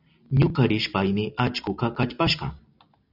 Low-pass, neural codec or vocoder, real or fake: 5.4 kHz; none; real